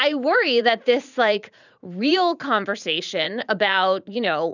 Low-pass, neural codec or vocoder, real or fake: 7.2 kHz; vocoder, 44.1 kHz, 80 mel bands, Vocos; fake